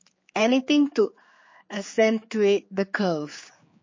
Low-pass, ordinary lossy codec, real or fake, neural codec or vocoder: 7.2 kHz; MP3, 32 kbps; fake; codec, 16 kHz, 4 kbps, X-Codec, HuBERT features, trained on general audio